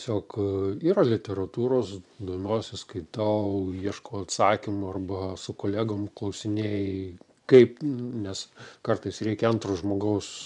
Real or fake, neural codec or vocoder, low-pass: fake; vocoder, 24 kHz, 100 mel bands, Vocos; 10.8 kHz